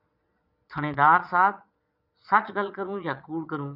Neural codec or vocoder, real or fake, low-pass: vocoder, 22.05 kHz, 80 mel bands, Vocos; fake; 5.4 kHz